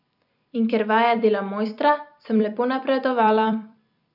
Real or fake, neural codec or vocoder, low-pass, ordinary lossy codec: fake; vocoder, 44.1 kHz, 128 mel bands every 256 samples, BigVGAN v2; 5.4 kHz; none